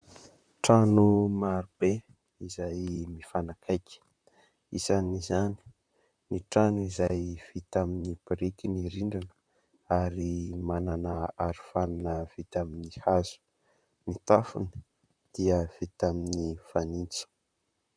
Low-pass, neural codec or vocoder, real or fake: 9.9 kHz; vocoder, 44.1 kHz, 128 mel bands, Pupu-Vocoder; fake